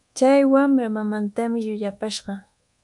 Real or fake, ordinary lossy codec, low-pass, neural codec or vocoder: fake; MP3, 96 kbps; 10.8 kHz; codec, 24 kHz, 1.2 kbps, DualCodec